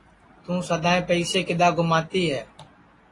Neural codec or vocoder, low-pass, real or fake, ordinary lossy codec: none; 10.8 kHz; real; AAC, 32 kbps